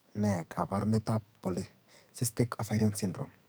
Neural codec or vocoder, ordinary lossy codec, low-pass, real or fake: codec, 44.1 kHz, 2.6 kbps, SNAC; none; none; fake